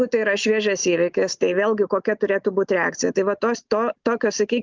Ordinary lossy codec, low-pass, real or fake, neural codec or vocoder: Opus, 24 kbps; 7.2 kHz; real; none